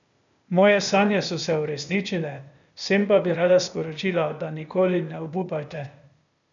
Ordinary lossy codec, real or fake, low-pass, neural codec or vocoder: none; fake; 7.2 kHz; codec, 16 kHz, 0.8 kbps, ZipCodec